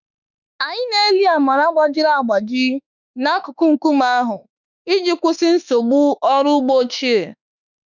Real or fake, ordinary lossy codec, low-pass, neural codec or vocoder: fake; none; 7.2 kHz; autoencoder, 48 kHz, 32 numbers a frame, DAC-VAE, trained on Japanese speech